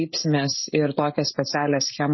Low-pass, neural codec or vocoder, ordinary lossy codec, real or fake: 7.2 kHz; codec, 16 kHz, 16 kbps, FreqCodec, smaller model; MP3, 24 kbps; fake